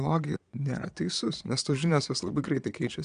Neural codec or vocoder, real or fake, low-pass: vocoder, 22.05 kHz, 80 mel bands, Vocos; fake; 9.9 kHz